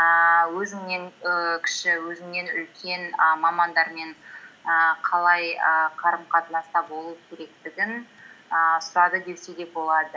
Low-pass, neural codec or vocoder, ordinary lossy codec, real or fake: none; none; none; real